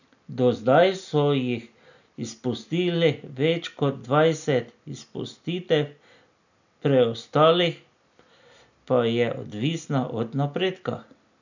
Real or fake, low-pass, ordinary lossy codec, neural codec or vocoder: real; 7.2 kHz; none; none